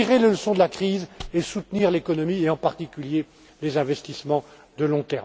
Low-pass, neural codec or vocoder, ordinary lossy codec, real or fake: none; none; none; real